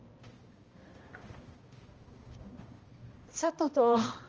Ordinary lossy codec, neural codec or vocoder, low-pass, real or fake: Opus, 24 kbps; codec, 16 kHz, 1 kbps, X-Codec, HuBERT features, trained on balanced general audio; 7.2 kHz; fake